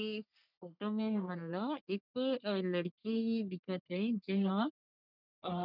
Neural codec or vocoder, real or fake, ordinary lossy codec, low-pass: codec, 44.1 kHz, 3.4 kbps, Pupu-Codec; fake; none; 5.4 kHz